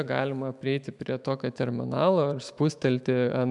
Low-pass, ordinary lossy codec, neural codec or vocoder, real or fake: 10.8 kHz; MP3, 96 kbps; autoencoder, 48 kHz, 128 numbers a frame, DAC-VAE, trained on Japanese speech; fake